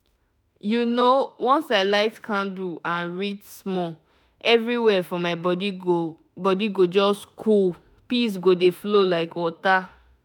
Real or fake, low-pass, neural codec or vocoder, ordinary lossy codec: fake; none; autoencoder, 48 kHz, 32 numbers a frame, DAC-VAE, trained on Japanese speech; none